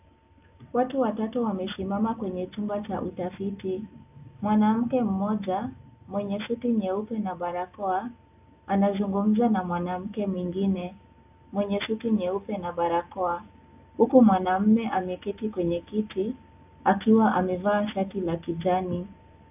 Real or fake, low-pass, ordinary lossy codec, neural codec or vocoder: real; 3.6 kHz; AAC, 32 kbps; none